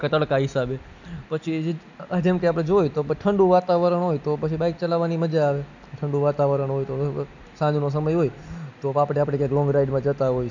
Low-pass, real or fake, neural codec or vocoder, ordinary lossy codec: 7.2 kHz; real; none; none